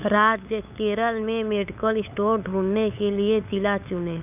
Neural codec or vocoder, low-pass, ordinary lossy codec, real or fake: codec, 16 kHz, 8 kbps, FunCodec, trained on LibriTTS, 25 frames a second; 3.6 kHz; none; fake